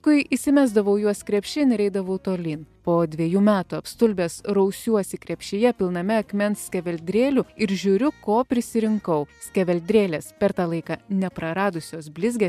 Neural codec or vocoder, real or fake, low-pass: none; real; 14.4 kHz